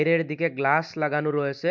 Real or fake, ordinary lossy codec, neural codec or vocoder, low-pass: real; MP3, 64 kbps; none; 7.2 kHz